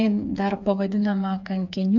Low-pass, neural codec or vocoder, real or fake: 7.2 kHz; codec, 16 kHz, 4 kbps, FreqCodec, smaller model; fake